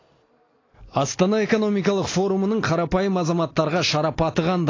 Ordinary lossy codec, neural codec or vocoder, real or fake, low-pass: AAC, 32 kbps; none; real; 7.2 kHz